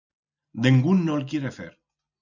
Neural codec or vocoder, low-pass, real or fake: none; 7.2 kHz; real